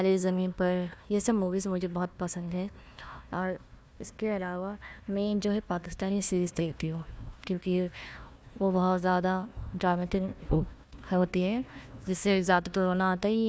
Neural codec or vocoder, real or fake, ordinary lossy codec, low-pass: codec, 16 kHz, 1 kbps, FunCodec, trained on Chinese and English, 50 frames a second; fake; none; none